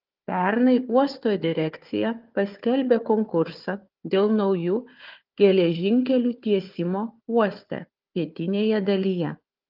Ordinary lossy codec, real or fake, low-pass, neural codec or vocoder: Opus, 32 kbps; fake; 5.4 kHz; codec, 16 kHz, 4 kbps, FunCodec, trained on Chinese and English, 50 frames a second